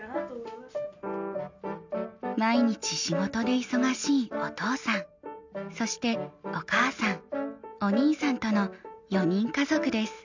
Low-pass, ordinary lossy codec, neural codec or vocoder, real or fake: 7.2 kHz; MP3, 64 kbps; none; real